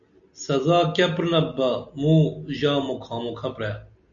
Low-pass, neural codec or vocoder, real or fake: 7.2 kHz; none; real